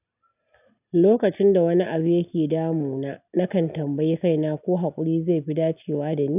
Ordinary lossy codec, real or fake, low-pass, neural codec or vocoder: none; real; 3.6 kHz; none